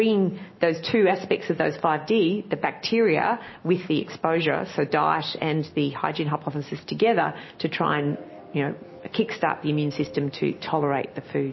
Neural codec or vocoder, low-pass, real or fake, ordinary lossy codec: none; 7.2 kHz; real; MP3, 24 kbps